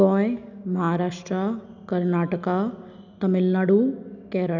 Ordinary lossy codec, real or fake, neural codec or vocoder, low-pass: none; real; none; 7.2 kHz